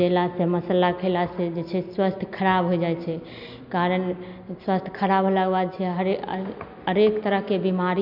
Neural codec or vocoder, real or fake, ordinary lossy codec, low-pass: none; real; none; 5.4 kHz